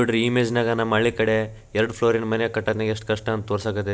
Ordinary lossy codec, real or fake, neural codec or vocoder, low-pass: none; real; none; none